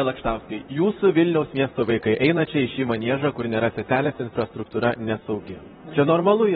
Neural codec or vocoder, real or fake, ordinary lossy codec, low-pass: vocoder, 44.1 kHz, 128 mel bands, Pupu-Vocoder; fake; AAC, 16 kbps; 19.8 kHz